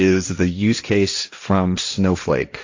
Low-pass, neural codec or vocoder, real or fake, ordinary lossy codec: 7.2 kHz; codec, 16 kHz, 1.1 kbps, Voila-Tokenizer; fake; AAC, 48 kbps